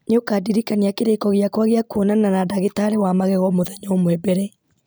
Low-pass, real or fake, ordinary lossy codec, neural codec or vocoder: none; real; none; none